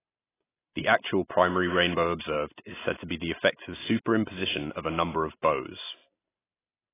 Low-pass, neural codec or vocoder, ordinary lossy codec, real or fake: 3.6 kHz; none; AAC, 16 kbps; real